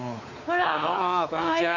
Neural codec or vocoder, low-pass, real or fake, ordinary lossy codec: codec, 16 kHz, 4 kbps, X-Codec, WavLM features, trained on Multilingual LibriSpeech; 7.2 kHz; fake; none